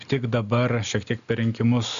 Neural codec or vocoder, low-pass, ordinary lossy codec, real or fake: none; 7.2 kHz; AAC, 96 kbps; real